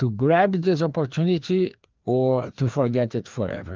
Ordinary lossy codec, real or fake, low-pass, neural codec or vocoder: Opus, 32 kbps; fake; 7.2 kHz; codec, 16 kHz, 2 kbps, FreqCodec, larger model